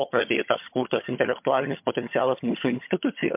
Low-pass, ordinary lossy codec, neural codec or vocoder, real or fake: 3.6 kHz; MP3, 32 kbps; vocoder, 22.05 kHz, 80 mel bands, HiFi-GAN; fake